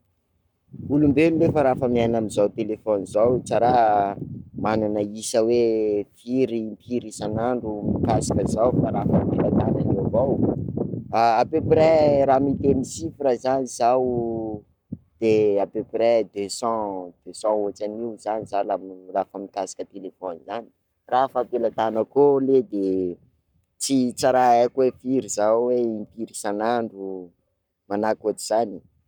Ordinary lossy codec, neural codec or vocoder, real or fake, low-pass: none; codec, 44.1 kHz, 7.8 kbps, Pupu-Codec; fake; 19.8 kHz